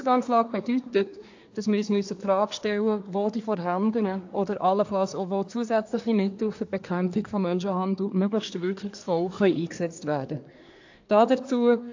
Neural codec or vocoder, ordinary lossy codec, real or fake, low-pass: codec, 24 kHz, 1 kbps, SNAC; AAC, 48 kbps; fake; 7.2 kHz